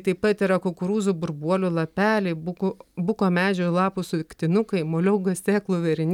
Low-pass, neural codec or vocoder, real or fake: 19.8 kHz; none; real